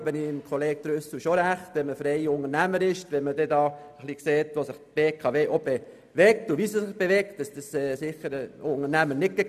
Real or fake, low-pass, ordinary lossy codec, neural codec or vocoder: real; 14.4 kHz; none; none